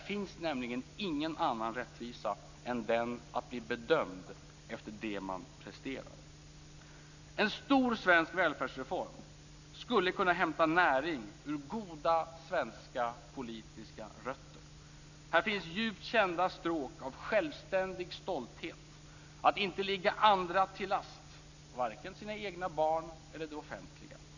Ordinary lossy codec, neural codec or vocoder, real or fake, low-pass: none; none; real; 7.2 kHz